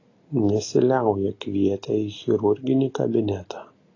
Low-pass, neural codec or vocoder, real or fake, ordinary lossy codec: 7.2 kHz; none; real; AAC, 32 kbps